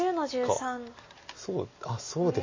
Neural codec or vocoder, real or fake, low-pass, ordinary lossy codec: none; real; 7.2 kHz; MP3, 32 kbps